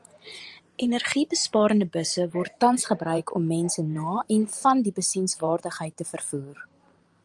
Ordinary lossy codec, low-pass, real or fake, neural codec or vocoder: Opus, 32 kbps; 10.8 kHz; fake; vocoder, 44.1 kHz, 128 mel bands every 512 samples, BigVGAN v2